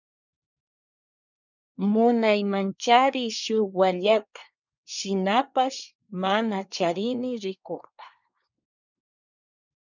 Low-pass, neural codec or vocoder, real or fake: 7.2 kHz; codec, 24 kHz, 1 kbps, SNAC; fake